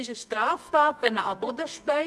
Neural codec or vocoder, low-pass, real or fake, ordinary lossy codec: codec, 24 kHz, 0.9 kbps, WavTokenizer, medium music audio release; none; fake; none